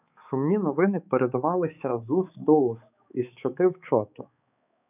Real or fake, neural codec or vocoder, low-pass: fake; codec, 16 kHz, 4 kbps, X-Codec, HuBERT features, trained on balanced general audio; 3.6 kHz